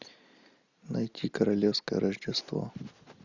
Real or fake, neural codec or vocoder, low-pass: real; none; 7.2 kHz